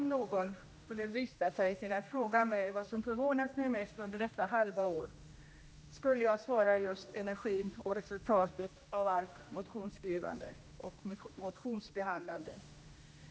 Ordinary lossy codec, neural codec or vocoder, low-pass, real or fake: none; codec, 16 kHz, 1 kbps, X-Codec, HuBERT features, trained on general audio; none; fake